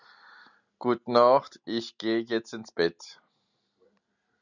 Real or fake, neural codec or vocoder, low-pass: real; none; 7.2 kHz